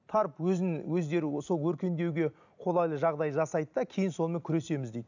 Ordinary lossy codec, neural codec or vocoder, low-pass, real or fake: none; none; 7.2 kHz; real